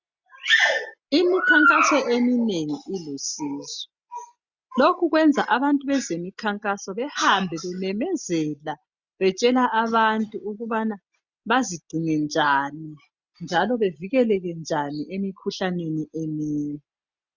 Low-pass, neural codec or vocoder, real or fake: 7.2 kHz; none; real